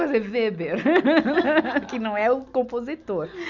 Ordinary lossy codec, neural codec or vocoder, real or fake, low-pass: none; none; real; 7.2 kHz